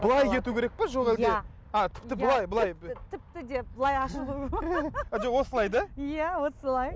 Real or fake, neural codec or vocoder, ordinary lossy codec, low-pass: real; none; none; none